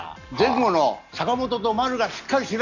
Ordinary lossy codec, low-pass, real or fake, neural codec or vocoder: none; 7.2 kHz; real; none